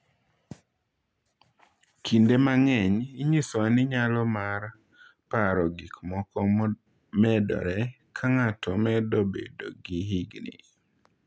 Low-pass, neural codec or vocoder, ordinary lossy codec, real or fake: none; none; none; real